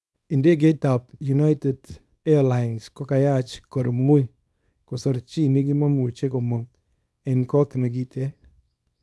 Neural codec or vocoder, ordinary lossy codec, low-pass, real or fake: codec, 24 kHz, 0.9 kbps, WavTokenizer, small release; none; none; fake